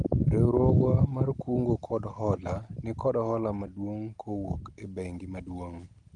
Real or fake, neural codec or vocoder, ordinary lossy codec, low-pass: real; none; Opus, 24 kbps; 9.9 kHz